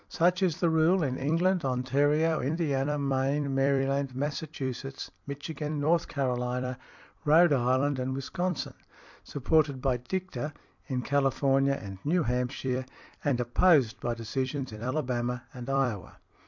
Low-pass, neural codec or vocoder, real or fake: 7.2 kHz; vocoder, 44.1 kHz, 128 mel bands every 256 samples, BigVGAN v2; fake